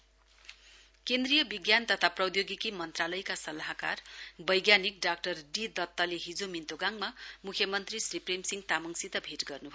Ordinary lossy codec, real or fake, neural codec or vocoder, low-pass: none; real; none; none